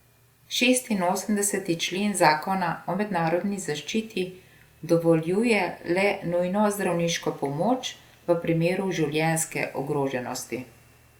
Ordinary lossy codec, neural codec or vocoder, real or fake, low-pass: Opus, 64 kbps; none; real; 19.8 kHz